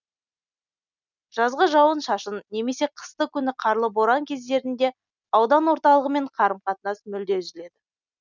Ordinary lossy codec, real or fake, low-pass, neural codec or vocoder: none; real; 7.2 kHz; none